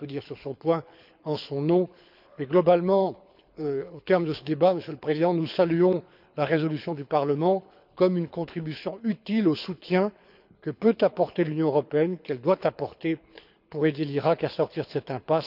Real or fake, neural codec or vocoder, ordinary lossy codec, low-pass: fake; codec, 24 kHz, 6 kbps, HILCodec; none; 5.4 kHz